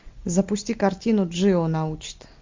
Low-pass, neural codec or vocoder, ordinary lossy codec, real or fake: 7.2 kHz; none; MP3, 64 kbps; real